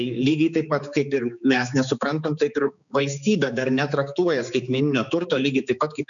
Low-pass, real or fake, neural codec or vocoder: 7.2 kHz; fake; codec, 16 kHz, 4 kbps, X-Codec, HuBERT features, trained on balanced general audio